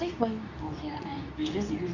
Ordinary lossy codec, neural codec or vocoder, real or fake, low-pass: none; codec, 24 kHz, 0.9 kbps, WavTokenizer, medium speech release version 2; fake; 7.2 kHz